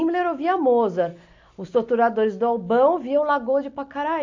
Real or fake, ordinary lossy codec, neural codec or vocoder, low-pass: real; AAC, 48 kbps; none; 7.2 kHz